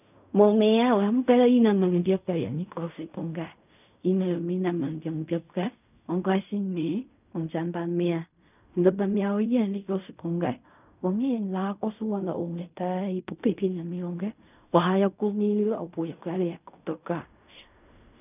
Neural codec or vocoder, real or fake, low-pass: codec, 16 kHz in and 24 kHz out, 0.4 kbps, LongCat-Audio-Codec, fine tuned four codebook decoder; fake; 3.6 kHz